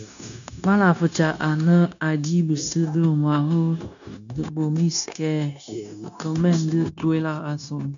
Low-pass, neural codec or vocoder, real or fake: 7.2 kHz; codec, 16 kHz, 0.9 kbps, LongCat-Audio-Codec; fake